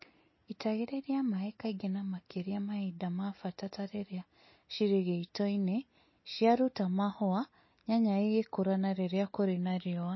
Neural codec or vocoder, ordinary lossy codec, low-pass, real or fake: none; MP3, 24 kbps; 7.2 kHz; real